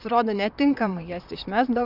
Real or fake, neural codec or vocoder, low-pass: fake; vocoder, 44.1 kHz, 128 mel bands, Pupu-Vocoder; 5.4 kHz